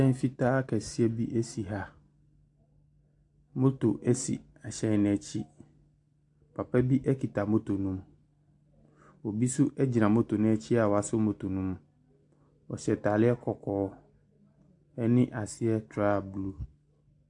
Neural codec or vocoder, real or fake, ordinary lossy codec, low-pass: none; real; AAC, 64 kbps; 10.8 kHz